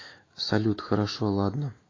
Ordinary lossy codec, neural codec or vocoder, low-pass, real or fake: AAC, 32 kbps; autoencoder, 48 kHz, 128 numbers a frame, DAC-VAE, trained on Japanese speech; 7.2 kHz; fake